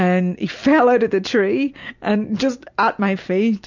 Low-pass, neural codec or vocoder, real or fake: 7.2 kHz; none; real